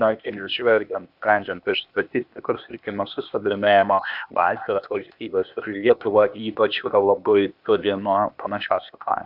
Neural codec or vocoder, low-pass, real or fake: codec, 16 kHz, 0.8 kbps, ZipCodec; 5.4 kHz; fake